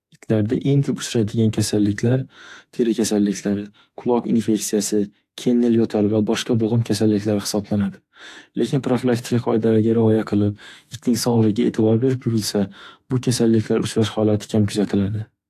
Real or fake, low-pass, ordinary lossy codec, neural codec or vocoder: fake; 14.4 kHz; AAC, 64 kbps; autoencoder, 48 kHz, 32 numbers a frame, DAC-VAE, trained on Japanese speech